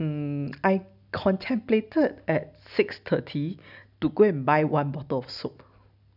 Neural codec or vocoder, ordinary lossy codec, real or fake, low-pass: none; none; real; 5.4 kHz